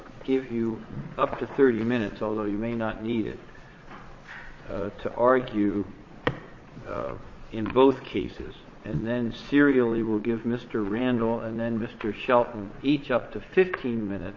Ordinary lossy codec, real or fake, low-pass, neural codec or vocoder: MP3, 32 kbps; fake; 7.2 kHz; vocoder, 22.05 kHz, 80 mel bands, Vocos